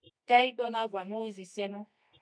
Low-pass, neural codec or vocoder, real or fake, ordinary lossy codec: 9.9 kHz; codec, 24 kHz, 0.9 kbps, WavTokenizer, medium music audio release; fake; AAC, 64 kbps